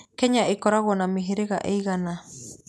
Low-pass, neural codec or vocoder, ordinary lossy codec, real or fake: none; none; none; real